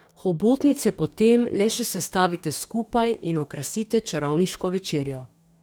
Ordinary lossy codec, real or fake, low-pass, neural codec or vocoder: none; fake; none; codec, 44.1 kHz, 2.6 kbps, DAC